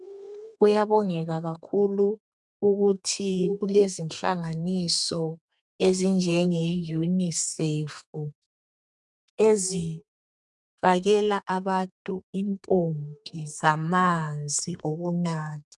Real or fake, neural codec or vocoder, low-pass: fake; codec, 32 kHz, 1.9 kbps, SNAC; 10.8 kHz